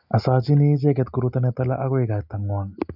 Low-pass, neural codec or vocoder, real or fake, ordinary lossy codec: 5.4 kHz; none; real; none